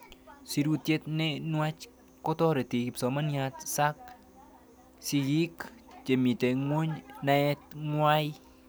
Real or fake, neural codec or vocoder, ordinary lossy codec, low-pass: real; none; none; none